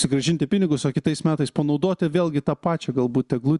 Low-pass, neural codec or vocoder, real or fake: 10.8 kHz; none; real